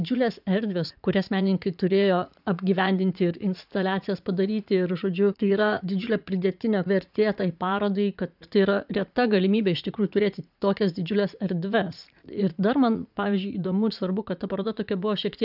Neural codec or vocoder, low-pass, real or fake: vocoder, 44.1 kHz, 80 mel bands, Vocos; 5.4 kHz; fake